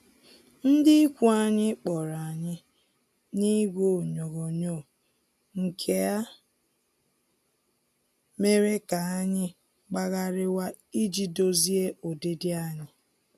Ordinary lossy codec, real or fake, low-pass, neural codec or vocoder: none; real; 14.4 kHz; none